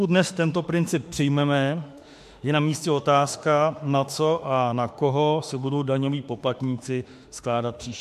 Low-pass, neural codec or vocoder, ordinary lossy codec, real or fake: 14.4 kHz; autoencoder, 48 kHz, 32 numbers a frame, DAC-VAE, trained on Japanese speech; MP3, 64 kbps; fake